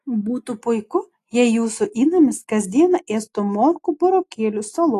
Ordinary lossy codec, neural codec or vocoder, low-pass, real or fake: AAC, 48 kbps; none; 14.4 kHz; real